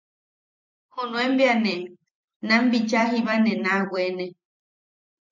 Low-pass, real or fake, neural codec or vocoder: 7.2 kHz; fake; vocoder, 44.1 kHz, 128 mel bands every 256 samples, BigVGAN v2